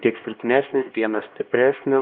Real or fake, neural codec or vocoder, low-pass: fake; codec, 16 kHz, 1 kbps, X-Codec, HuBERT features, trained on LibriSpeech; 7.2 kHz